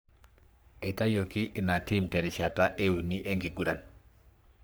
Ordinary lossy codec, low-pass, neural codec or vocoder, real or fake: none; none; codec, 44.1 kHz, 3.4 kbps, Pupu-Codec; fake